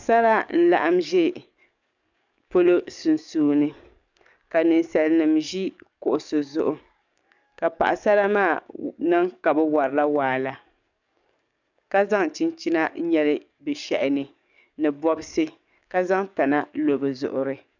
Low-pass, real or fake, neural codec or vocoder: 7.2 kHz; fake; codec, 44.1 kHz, 7.8 kbps, DAC